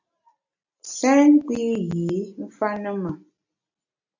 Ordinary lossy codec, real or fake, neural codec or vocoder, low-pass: AAC, 48 kbps; real; none; 7.2 kHz